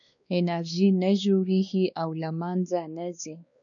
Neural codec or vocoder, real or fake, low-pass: codec, 16 kHz, 2 kbps, X-Codec, WavLM features, trained on Multilingual LibriSpeech; fake; 7.2 kHz